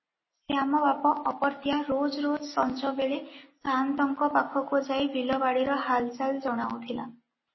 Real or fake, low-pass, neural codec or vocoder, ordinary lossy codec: real; 7.2 kHz; none; MP3, 24 kbps